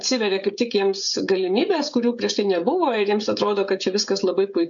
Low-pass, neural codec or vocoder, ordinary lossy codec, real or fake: 7.2 kHz; codec, 16 kHz, 16 kbps, FreqCodec, smaller model; MP3, 64 kbps; fake